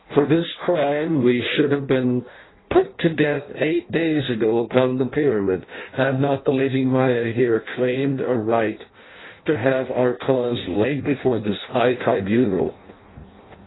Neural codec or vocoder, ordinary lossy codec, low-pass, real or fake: codec, 16 kHz in and 24 kHz out, 0.6 kbps, FireRedTTS-2 codec; AAC, 16 kbps; 7.2 kHz; fake